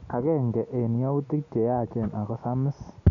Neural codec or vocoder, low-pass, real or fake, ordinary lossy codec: none; 7.2 kHz; real; none